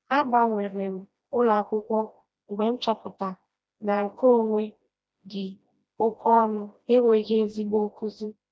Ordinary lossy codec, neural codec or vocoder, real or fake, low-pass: none; codec, 16 kHz, 1 kbps, FreqCodec, smaller model; fake; none